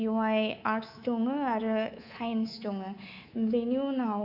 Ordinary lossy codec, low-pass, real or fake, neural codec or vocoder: none; 5.4 kHz; fake; codec, 24 kHz, 3.1 kbps, DualCodec